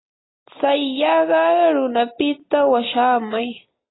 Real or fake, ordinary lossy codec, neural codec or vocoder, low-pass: real; AAC, 16 kbps; none; 7.2 kHz